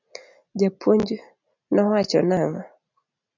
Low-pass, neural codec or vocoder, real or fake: 7.2 kHz; none; real